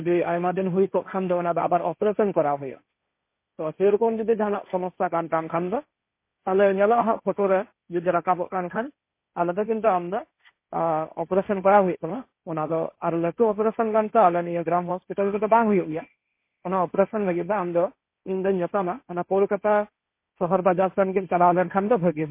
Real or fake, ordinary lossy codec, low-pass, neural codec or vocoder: fake; MP3, 24 kbps; 3.6 kHz; codec, 16 kHz, 1.1 kbps, Voila-Tokenizer